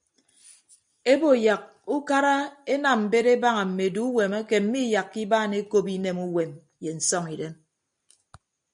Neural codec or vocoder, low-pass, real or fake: none; 9.9 kHz; real